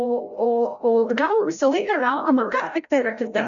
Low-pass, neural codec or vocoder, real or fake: 7.2 kHz; codec, 16 kHz, 0.5 kbps, FreqCodec, larger model; fake